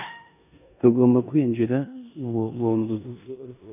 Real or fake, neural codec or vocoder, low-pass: fake; codec, 16 kHz in and 24 kHz out, 0.9 kbps, LongCat-Audio-Codec, four codebook decoder; 3.6 kHz